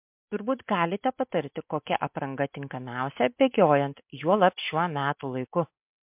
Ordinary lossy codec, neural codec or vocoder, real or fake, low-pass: MP3, 32 kbps; none; real; 3.6 kHz